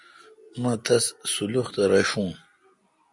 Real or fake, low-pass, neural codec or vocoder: real; 10.8 kHz; none